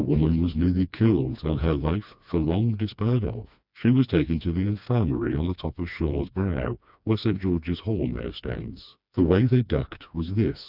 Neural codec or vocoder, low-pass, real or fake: codec, 16 kHz, 2 kbps, FreqCodec, smaller model; 5.4 kHz; fake